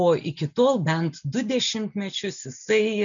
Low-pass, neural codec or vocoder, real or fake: 7.2 kHz; none; real